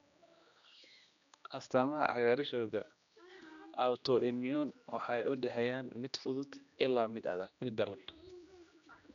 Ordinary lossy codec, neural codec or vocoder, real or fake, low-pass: none; codec, 16 kHz, 1 kbps, X-Codec, HuBERT features, trained on general audio; fake; 7.2 kHz